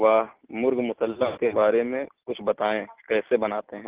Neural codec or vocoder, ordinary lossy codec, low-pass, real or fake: none; Opus, 16 kbps; 3.6 kHz; real